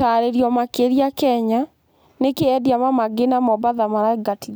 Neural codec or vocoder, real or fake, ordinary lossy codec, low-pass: none; real; none; none